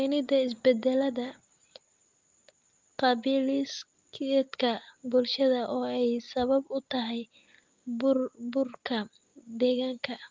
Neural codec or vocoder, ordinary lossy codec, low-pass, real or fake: none; Opus, 24 kbps; 7.2 kHz; real